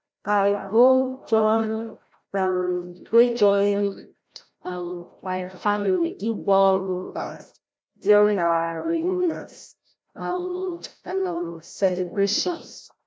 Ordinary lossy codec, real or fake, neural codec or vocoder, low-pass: none; fake; codec, 16 kHz, 0.5 kbps, FreqCodec, larger model; none